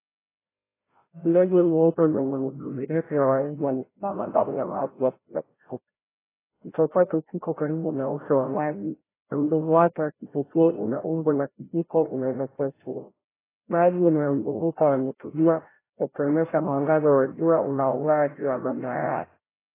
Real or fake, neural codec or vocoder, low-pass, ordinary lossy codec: fake; codec, 16 kHz, 0.5 kbps, FreqCodec, larger model; 3.6 kHz; AAC, 16 kbps